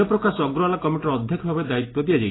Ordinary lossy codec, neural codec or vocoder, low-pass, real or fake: AAC, 16 kbps; none; 7.2 kHz; real